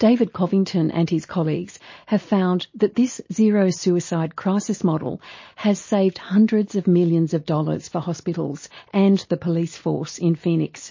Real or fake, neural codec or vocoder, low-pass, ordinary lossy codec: real; none; 7.2 kHz; MP3, 32 kbps